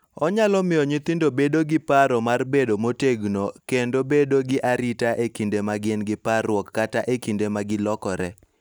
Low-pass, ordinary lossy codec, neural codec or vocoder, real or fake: none; none; none; real